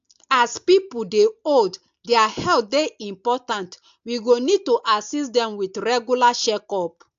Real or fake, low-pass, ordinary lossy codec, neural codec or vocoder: real; 7.2 kHz; AAC, 64 kbps; none